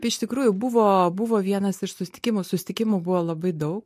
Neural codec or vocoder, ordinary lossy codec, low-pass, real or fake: none; MP3, 64 kbps; 14.4 kHz; real